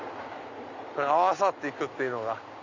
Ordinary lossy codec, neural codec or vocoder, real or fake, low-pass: MP3, 64 kbps; none; real; 7.2 kHz